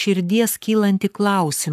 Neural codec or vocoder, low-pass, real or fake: codec, 44.1 kHz, 7.8 kbps, Pupu-Codec; 14.4 kHz; fake